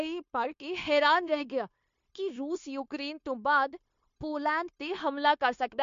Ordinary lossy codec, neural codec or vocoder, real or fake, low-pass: MP3, 48 kbps; codec, 16 kHz, 0.9 kbps, LongCat-Audio-Codec; fake; 7.2 kHz